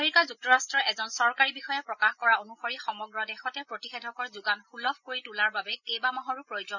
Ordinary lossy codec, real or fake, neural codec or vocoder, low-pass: none; real; none; 7.2 kHz